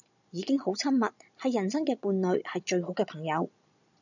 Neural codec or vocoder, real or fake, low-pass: vocoder, 22.05 kHz, 80 mel bands, Vocos; fake; 7.2 kHz